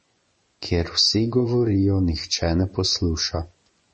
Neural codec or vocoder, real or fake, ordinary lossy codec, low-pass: vocoder, 24 kHz, 100 mel bands, Vocos; fake; MP3, 32 kbps; 10.8 kHz